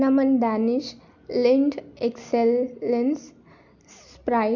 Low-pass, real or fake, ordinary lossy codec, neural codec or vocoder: 7.2 kHz; real; none; none